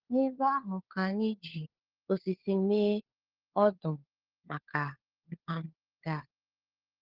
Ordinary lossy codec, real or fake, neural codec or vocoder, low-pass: Opus, 16 kbps; fake; codec, 16 kHz, 4 kbps, FunCodec, trained on LibriTTS, 50 frames a second; 5.4 kHz